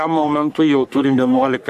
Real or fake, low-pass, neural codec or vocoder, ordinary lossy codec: fake; 14.4 kHz; codec, 44.1 kHz, 3.4 kbps, Pupu-Codec; MP3, 96 kbps